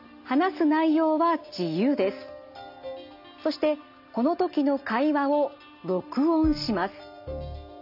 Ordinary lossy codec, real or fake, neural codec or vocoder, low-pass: none; real; none; 5.4 kHz